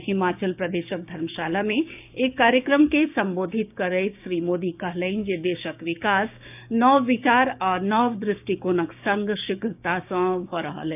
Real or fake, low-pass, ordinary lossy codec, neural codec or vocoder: fake; 3.6 kHz; none; codec, 16 kHz, 6 kbps, DAC